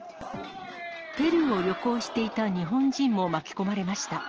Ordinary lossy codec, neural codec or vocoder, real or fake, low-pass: Opus, 16 kbps; none; real; 7.2 kHz